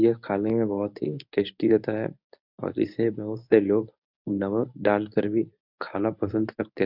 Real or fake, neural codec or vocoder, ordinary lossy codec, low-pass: fake; codec, 24 kHz, 0.9 kbps, WavTokenizer, medium speech release version 1; none; 5.4 kHz